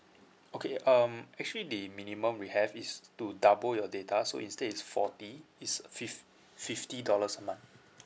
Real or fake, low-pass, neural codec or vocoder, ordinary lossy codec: real; none; none; none